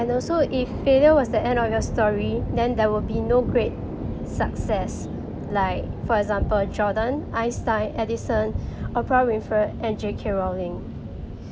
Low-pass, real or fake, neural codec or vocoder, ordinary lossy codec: none; real; none; none